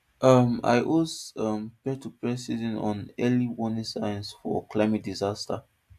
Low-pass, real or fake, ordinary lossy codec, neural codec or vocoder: 14.4 kHz; real; AAC, 96 kbps; none